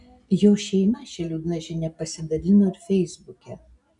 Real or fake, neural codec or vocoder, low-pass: real; none; 10.8 kHz